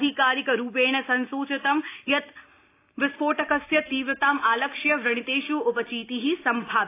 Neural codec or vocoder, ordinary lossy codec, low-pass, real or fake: none; AAC, 24 kbps; 3.6 kHz; real